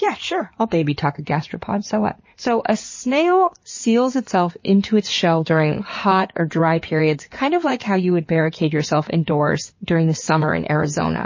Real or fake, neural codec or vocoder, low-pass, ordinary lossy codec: fake; codec, 16 kHz in and 24 kHz out, 2.2 kbps, FireRedTTS-2 codec; 7.2 kHz; MP3, 32 kbps